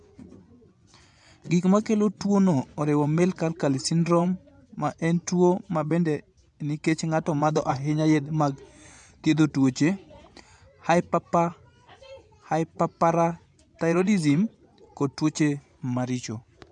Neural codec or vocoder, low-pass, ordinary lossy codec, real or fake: vocoder, 24 kHz, 100 mel bands, Vocos; 10.8 kHz; none; fake